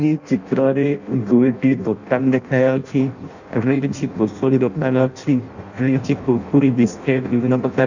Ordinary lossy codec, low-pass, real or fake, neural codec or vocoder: none; 7.2 kHz; fake; codec, 16 kHz in and 24 kHz out, 0.6 kbps, FireRedTTS-2 codec